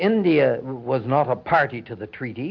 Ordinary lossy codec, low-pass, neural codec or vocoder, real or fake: MP3, 48 kbps; 7.2 kHz; none; real